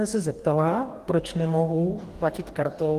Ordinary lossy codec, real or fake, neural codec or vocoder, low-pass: Opus, 24 kbps; fake; codec, 44.1 kHz, 2.6 kbps, DAC; 14.4 kHz